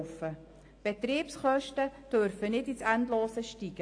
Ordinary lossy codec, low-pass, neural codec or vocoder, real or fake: AAC, 48 kbps; 9.9 kHz; none; real